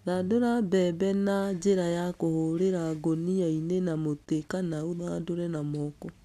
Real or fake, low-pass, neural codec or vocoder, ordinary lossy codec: real; 14.4 kHz; none; none